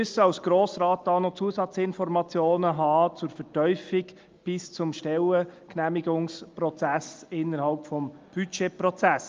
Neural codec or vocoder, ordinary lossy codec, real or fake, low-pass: none; Opus, 24 kbps; real; 7.2 kHz